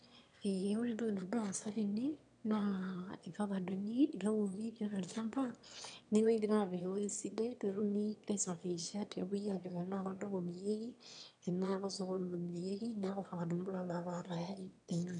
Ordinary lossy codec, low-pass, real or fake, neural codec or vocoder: none; 9.9 kHz; fake; autoencoder, 22.05 kHz, a latent of 192 numbers a frame, VITS, trained on one speaker